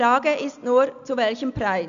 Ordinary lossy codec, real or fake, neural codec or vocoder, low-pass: none; real; none; 7.2 kHz